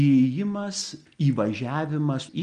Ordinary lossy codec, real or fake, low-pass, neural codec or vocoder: Opus, 32 kbps; real; 9.9 kHz; none